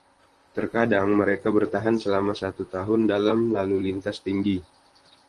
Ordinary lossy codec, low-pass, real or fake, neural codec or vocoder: Opus, 24 kbps; 10.8 kHz; fake; vocoder, 44.1 kHz, 128 mel bands, Pupu-Vocoder